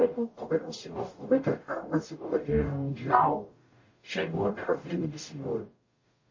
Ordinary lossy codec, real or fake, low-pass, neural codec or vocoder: MP3, 32 kbps; fake; 7.2 kHz; codec, 44.1 kHz, 0.9 kbps, DAC